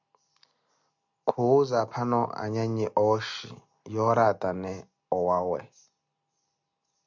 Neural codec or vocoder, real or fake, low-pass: none; real; 7.2 kHz